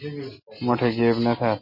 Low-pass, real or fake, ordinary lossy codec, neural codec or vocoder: 5.4 kHz; real; MP3, 24 kbps; none